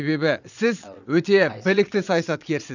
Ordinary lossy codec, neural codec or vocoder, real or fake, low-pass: none; vocoder, 22.05 kHz, 80 mel bands, Vocos; fake; 7.2 kHz